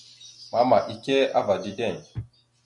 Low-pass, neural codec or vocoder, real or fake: 10.8 kHz; none; real